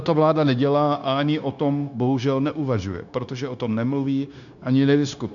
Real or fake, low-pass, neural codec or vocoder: fake; 7.2 kHz; codec, 16 kHz, 0.9 kbps, LongCat-Audio-Codec